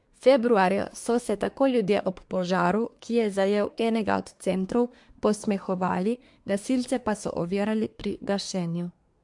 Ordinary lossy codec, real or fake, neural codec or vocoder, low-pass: MP3, 64 kbps; fake; codec, 24 kHz, 1 kbps, SNAC; 10.8 kHz